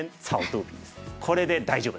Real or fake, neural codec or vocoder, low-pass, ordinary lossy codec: real; none; none; none